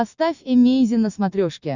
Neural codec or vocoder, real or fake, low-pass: none; real; 7.2 kHz